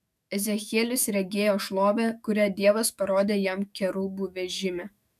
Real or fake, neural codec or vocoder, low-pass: fake; autoencoder, 48 kHz, 128 numbers a frame, DAC-VAE, trained on Japanese speech; 14.4 kHz